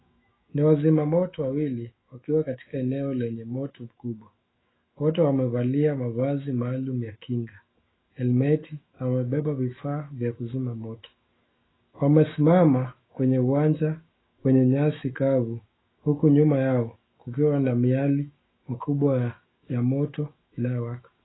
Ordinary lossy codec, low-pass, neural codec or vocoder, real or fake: AAC, 16 kbps; 7.2 kHz; autoencoder, 48 kHz, 128 numbers a frame, DAC-VAE, trained on Japanese speech; fake